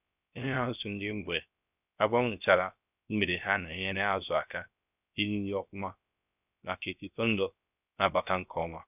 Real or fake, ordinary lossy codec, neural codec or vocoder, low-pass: fake; none; codec, 16 kHz, 0.3 kbps, FocalCodec; 3.6 kHz